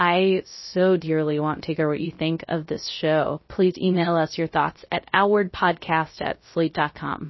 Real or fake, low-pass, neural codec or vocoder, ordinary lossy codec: fake; 7.2 kHz; codec, 16 kHz, 0.3 kbps, FocalCodec; MP3, 24 kbps